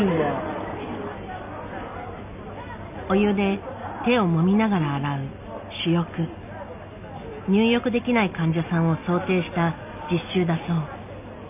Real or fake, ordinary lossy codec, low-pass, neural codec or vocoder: real; none; 3.6 kHz; none